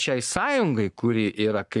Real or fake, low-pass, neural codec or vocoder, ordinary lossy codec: fake; 10.8 kHz; codec, 44.1 kHz, 7.8 kbps, Pupu-Codec; MP3, 96 kbps